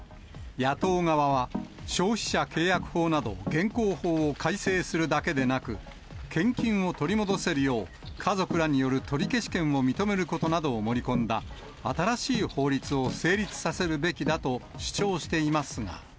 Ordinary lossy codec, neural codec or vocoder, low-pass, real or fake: none; none; none; real